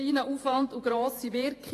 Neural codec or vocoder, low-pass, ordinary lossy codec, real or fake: vocoder, 48 kHz, 128 mel bands, Vocos; 14.4 kHz; AAC, 48 kbps; fake